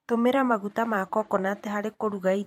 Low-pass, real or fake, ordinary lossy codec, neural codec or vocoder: 19.8 kHz; fake; MP3, 64 kbps; vocoder, 44.1 kHz, 128 mel bands every 512 samples, BigVGAN v2